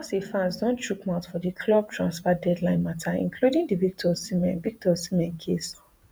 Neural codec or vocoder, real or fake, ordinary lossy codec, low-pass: vocoder, 48 kHz, 128 mel bands, Vocos; fake; none; none